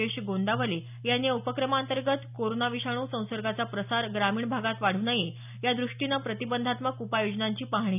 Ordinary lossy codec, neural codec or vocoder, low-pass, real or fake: none; none; 3.6 kHz; real